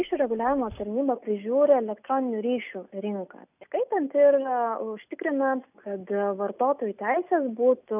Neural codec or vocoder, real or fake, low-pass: none; real; 3.6 kHz